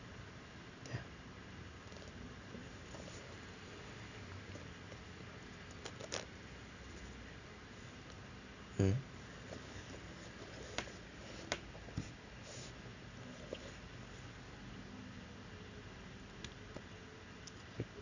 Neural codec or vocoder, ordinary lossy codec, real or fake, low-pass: none; none; real; 7.2 kHz